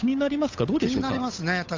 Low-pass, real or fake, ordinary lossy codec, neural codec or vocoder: 7.2 kHz; fake; AAC, 48 kbps; codec, 16 kHz, 8 kbps, FunCodec, trained on Chinese and English, 25 frames a second